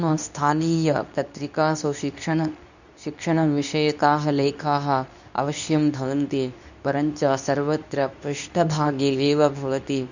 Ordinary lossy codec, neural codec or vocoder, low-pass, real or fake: none; codec, 24 kHz, 0.9 kbps, WavTokenizer, medium speech release version 2; 7.2 kHz; fake